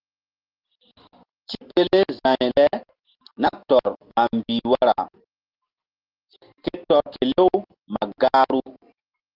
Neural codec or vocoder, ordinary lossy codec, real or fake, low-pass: none; Opus, 16 kbps; real; 5.4 kHz